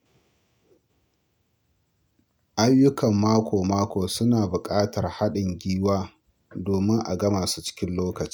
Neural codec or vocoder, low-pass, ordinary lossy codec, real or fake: none; none; none; real